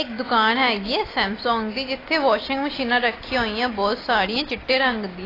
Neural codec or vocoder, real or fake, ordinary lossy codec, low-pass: none; real; AAC, 24 kbps; 5.4 kHz